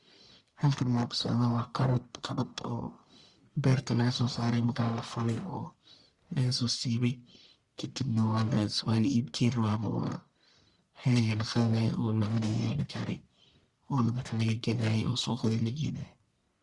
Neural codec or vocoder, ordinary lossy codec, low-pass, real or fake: codec, 44.1 kHz, 1.7 kbps, Pupu-Codec; Opus, 64 kbps; 10.8 kHz; fake